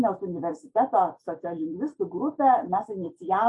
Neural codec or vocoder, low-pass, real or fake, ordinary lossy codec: none; 10.8 kHz; real; AAC, 64 kbps